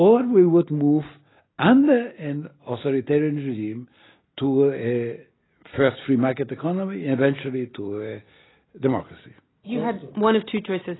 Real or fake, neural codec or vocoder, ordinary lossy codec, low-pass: real; none; AAC, 16 kbps; 7.2 kHz